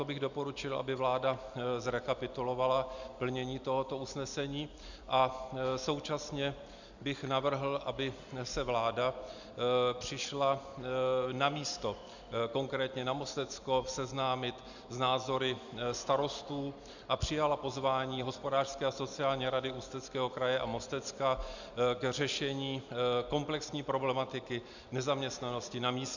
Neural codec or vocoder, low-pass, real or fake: none; 7.2 kHz; real